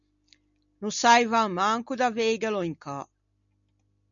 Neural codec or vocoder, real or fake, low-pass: none; real; 7.2 kHz